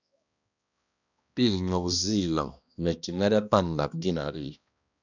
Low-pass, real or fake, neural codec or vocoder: 7.2 kHz; fake; codec, 16 kHz, 1 kbps, X-Codec, HuBERT features, trained on balanced general audio